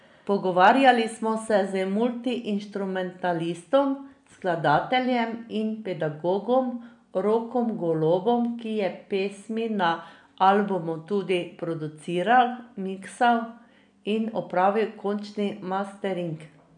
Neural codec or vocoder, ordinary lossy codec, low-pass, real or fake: none; none; 9.9 kHz; real